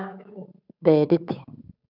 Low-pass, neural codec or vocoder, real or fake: 5.4 kHz; codec, 16 kHz, 8 kbps, FunCodec, trained on Chinese and English, 25 frames a second; fake